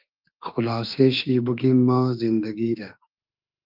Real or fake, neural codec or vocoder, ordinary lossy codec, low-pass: fake; autoencoder, 48 kHz, 32 numbers a frame, DAC-VAE, trained on Japanese speech; Opus, 24 kbps; 5.4 kHz